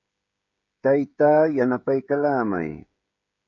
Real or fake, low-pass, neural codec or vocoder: fake; 7.2 kHz; codec, 16 kHz, 16 kbps, FreqCodec, smaller model